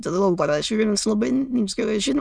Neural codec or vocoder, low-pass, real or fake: autoencoder, 22.05 kHz, a latent of 192 numbers a frame, VITS, trained on many speakers; 9.9 kHz; fake